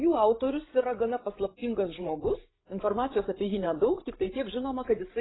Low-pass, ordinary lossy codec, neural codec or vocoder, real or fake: 7.2 kHz; AAC, 16 kbps; codec, 16 kHz, 16 kbps, FreqCodec, larger model; fake